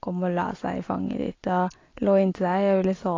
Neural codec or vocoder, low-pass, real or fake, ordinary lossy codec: none; 7.2 kHz; real; AAC, 32 kbps